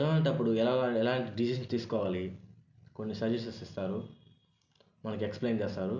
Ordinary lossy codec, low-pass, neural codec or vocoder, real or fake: none; 7.2 kHz; none; real